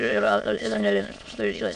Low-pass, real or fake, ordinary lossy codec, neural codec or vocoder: 9.9 kHz; fake; MP3, 96 kbps; autoencoder, 22.05 kHz, a latent of 192 numbers a frame, VITS, trained on many speakers